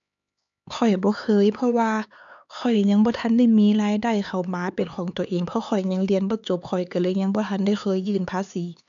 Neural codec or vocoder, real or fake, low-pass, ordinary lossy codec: codec, 16 kHz, 4 kbps, X-Codec, HuBERT features, trained on LibriSpeech; fake; 7.2 kHz; none